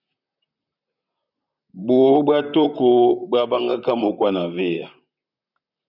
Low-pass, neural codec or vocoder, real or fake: 5.4 kHz; vocoder, 44.1 kHz, 128 mel bands, Pupu-Vocoder; fake